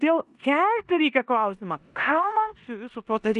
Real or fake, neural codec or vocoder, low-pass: fake; codec, 16 kHz in and 24 kHz out, 0.9 kbps, LongCat-Audio-Codec, four codebook decoder; 10.8 kHz